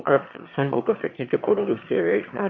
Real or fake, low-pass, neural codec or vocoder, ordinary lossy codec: fake; 7.2 kHz; autoencoder, 22.05 kHz, a latent of 192 numbers a frame, VITS, trained on one speaker; MP3, 32 kbps